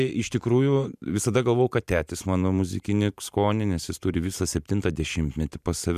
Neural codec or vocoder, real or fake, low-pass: vocoder, 44.1 kHz, 128 mel bands every 512 samples, BigVGAN v2; fake; 14.4 kHz